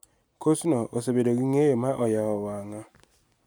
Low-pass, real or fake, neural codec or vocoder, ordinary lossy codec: none; real; none; none